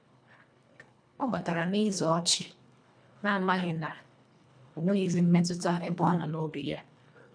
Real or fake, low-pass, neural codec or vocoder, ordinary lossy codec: fake; 9.9 kHz; codec, 24 kHz, 1.5 kbps, HILCodec; none